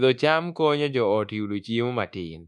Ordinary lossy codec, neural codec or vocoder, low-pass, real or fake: none; codec, 24 kHz, 1.2 kbps, DualCodec; none; fake